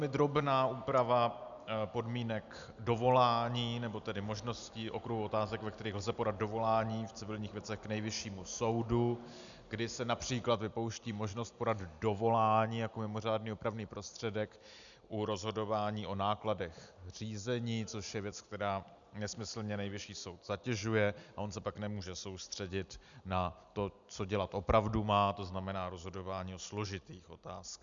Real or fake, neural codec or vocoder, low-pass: real; none; 7.2 kHz